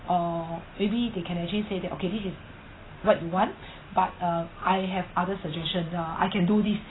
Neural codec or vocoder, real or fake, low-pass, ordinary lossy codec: none; real; 7.2 kHz; AAC, 16 kbps